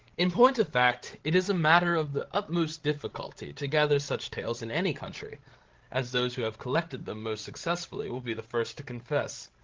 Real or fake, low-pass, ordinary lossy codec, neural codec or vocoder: fake; 7.2 kHz; Opus, 16 kbps; codec, 16 kHz, 16 kbps, FreqCodec, larger model